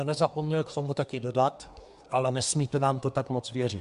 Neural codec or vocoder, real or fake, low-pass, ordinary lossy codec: codec, 24 kHz, 1 kbps, SNAC; fake; 10.8 kHz; MP3, 96 kbps